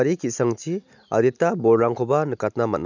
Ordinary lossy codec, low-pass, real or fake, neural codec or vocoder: none; 7.2 kHz; real; none